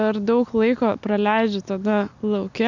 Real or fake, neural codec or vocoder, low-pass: real; none; 7.2 kHz